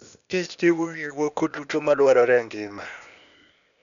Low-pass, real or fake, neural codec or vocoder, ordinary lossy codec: 7.2 kHz; fake; codec, 16 kHz, 0.8 kbps, ZipCodec; none